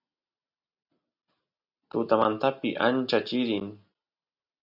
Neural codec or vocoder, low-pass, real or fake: none; 5.4 kHz; real